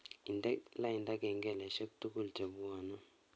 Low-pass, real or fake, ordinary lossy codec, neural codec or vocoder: none; real; none; none